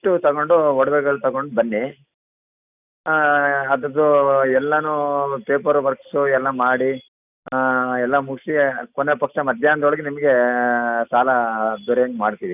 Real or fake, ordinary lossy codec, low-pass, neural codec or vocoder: real; none; 3.6 kHz; none